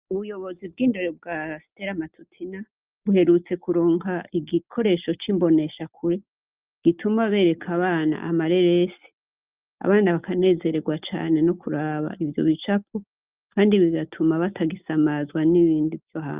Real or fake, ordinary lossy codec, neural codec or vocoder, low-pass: real; Opus, 24 kbps; none; 3.6 kHz